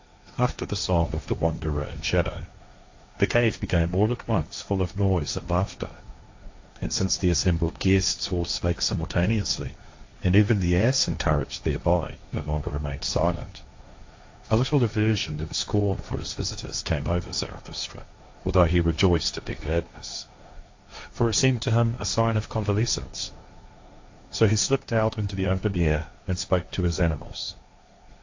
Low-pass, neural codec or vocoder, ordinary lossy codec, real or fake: 7.2 kHz; codec, 16 kHz, 1.1 kbps, Voila-Tokenizer; AAC, 48 kbps; fake